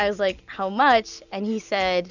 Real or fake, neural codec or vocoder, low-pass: real; none; 7.2 kHz